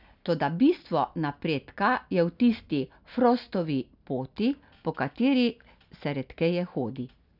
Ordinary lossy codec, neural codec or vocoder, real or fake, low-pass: none; none; real; 5.4 kHz